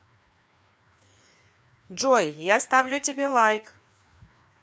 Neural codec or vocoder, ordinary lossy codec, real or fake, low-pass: codec, 16 kHz, 2 kbps, FreqCodec, larger model; none; fake; none